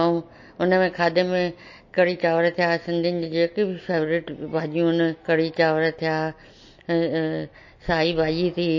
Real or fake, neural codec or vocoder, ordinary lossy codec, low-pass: real; none; MP3, 32 kbps; 7.2 kHz